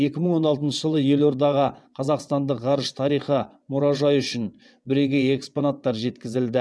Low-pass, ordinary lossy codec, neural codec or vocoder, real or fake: none; none; vocoder, 22.05 kHz, 80 mel bands, WaveNeXt; fake